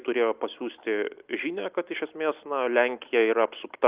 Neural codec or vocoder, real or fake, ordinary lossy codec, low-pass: none; real; Opus, 24 kbps; 3.6 kHz